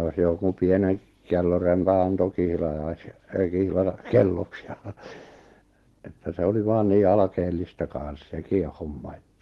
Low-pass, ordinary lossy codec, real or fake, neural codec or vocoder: 19.8 kHz; Opus, 16 kbps; real; none